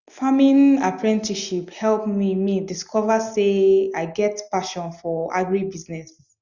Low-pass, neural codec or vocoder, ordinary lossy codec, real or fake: 7.2 kHz; none; Opus, 64 kbps; real